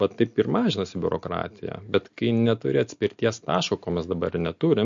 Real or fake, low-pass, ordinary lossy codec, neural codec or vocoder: real; 7.2 kHz; MP3, 48 kbps; none